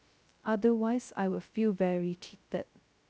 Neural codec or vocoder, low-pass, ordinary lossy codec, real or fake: codec, 16 kHz, 0.2 kbps, FocalCodec; none; none; fake